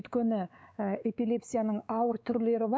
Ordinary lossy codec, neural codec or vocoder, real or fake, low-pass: none; codec, 16 kHz, 4 kbps, X-Codec, WavLM features, trained on Multilingual LibriSpeech; fake; none